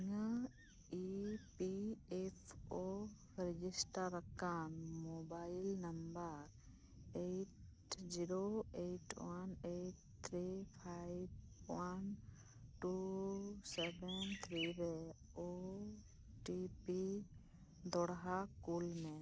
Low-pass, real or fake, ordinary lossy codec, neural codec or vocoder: none; real; none; none